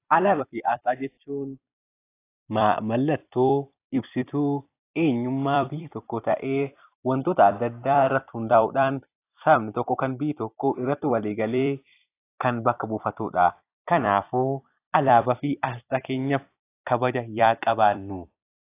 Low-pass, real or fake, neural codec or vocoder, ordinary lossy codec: 3.6 kHz; fake; vocoder, 44.1 kHz, 128 mel bands every 256 samples, BigVGAN v2; AAC, 24 kbps